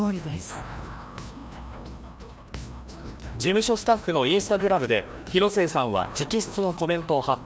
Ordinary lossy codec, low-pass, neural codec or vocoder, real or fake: none; none; codec, 16 kHz, 1 kbps, FreqCodec, larger model; fake